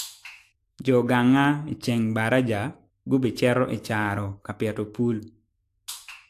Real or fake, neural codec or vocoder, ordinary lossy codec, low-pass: fake; autoencoder, 48 kHz, 128 numbers a frame, DAC-VAE, trained on Japanese speech; AAC, 64 kbps; 14.4 kHz